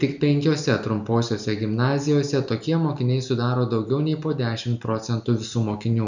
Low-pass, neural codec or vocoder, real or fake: 7.2 kHz; none; real